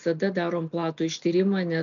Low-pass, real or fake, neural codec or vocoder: 7.2 kHz; real; none